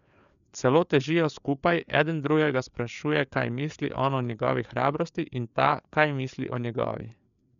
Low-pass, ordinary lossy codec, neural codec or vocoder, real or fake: 7.2 kHz; none; codec, 16 kHz, 4 kbps, FreqCodec, larger model; fake